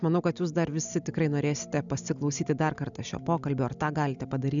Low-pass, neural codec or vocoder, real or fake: 7.2 kHz; none; real